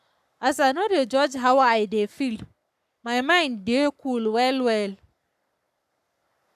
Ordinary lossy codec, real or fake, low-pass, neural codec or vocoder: none; fake; 14.4 kHz; codec, 44.1 kHz, 7.8 kbps, Pupu-Codec